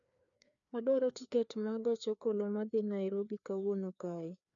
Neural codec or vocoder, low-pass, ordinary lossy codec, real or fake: codec, 16 kHz, 2 kbps, FreqCodec, larger model; 7.2 kHz; MP3, 96 kbps; fake